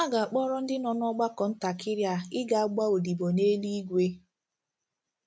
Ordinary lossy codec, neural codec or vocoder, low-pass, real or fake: none; none; none; real